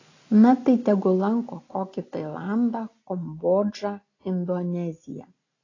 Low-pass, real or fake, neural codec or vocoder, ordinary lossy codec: 7.2 kHz; real; none; AAC, 32 kbps